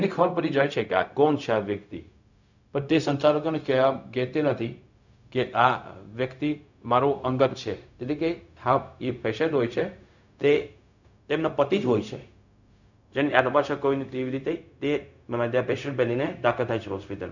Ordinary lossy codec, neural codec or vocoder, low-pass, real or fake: none; codec, 16 kHz, 0.4 kbps, LongCat-Audio-Codec; 7.2 kHz; fake